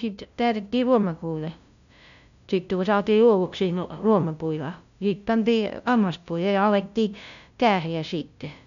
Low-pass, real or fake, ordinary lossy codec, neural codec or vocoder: 7.2 kHz; fake; none; codec, 16 kHz, 0.5 kbps, FunCodec, trained on LibriTTS, 25 frames a second